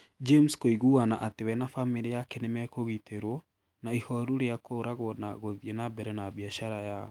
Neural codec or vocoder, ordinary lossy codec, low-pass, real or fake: autoencoder, 48 kHz, 128 numbers a frame, DAC-VAE, trained on Japanese speech; Opus, 32 kbps; 19.8 kHz; fake